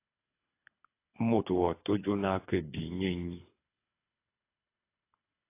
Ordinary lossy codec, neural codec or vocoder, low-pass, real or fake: AAC, 24 kbps; codec, 24 kHz, 6 kbps, HILCodec; 3.6 kHz; fake